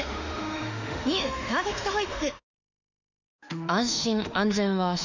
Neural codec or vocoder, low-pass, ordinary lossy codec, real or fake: autoencoder, 48 kHz, 32 numbers a frame, DAC-VAE, trained on Japanese speech; 7.2 kHz; none; fake